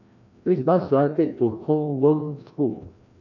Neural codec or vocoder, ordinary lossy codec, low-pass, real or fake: codec, 16 kHz, 1 kbps, FreqCodec, larger model; none; 7.2 kHz; fake